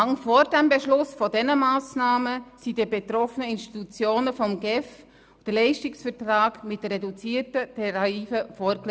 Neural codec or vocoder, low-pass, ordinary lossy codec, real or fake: none; none; none; real